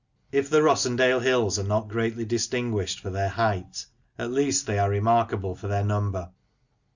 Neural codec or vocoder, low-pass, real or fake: none; 7.2 kHz; real